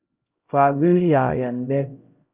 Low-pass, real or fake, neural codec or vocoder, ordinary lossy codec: 3.6 kHz; fake; codec, 16 kHz, 0.5 kbps, X-Codec, HuBERT features, trained on LibriSpeech; Opus, 24 kbps